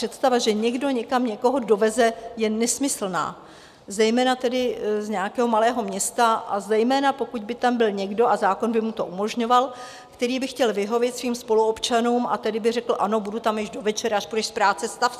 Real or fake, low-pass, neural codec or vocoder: real; 14.4 kHz; none